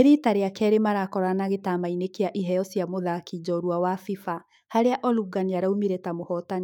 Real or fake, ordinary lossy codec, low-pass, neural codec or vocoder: fake; none; 19.8 kHz; autoencoder, 48 kHz, 128 numbers a frame, DAC-VAE, trained on Japanese speech